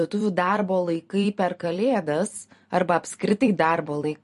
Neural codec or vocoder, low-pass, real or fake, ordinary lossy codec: vocoder, 44.1 kHz, 128 mel bands every 256 samples, BigVGAN v2; 14.4 kHz; fake; MP3, 48 kbps